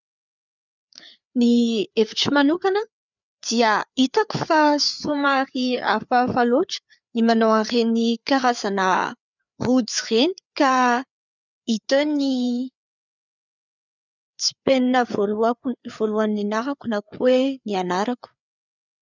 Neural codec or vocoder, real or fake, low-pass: codec, 16 kHz, 4 kbps, FreqCodec, larger model; fake; 7.2 kHz